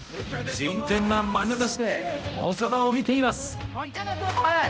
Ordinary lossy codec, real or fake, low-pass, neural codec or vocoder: none; fake; none; codec, 16 kHz, 0.5 kbps, X-Codec, HuBERT features, trained on balanced general audio